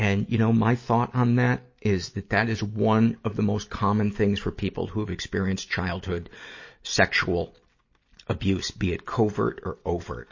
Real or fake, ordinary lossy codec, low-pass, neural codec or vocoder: real; MP3, 32 kbps; 7.2 kHz; none